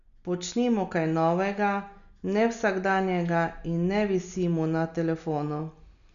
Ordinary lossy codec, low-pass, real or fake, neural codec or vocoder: none; 7.2 kHz; real; none